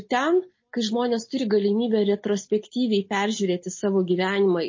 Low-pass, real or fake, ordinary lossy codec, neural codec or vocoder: 7.2 kHz; real; MP3, 32 kbps; none